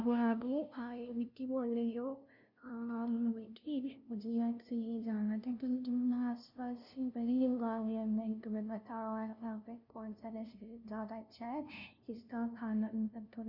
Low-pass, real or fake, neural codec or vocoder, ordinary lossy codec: 5.4 kHz; fake; codec, 16 kHz, 0.5 kbps, FunCodec, trained on LibriTTS, 25 frames a second; none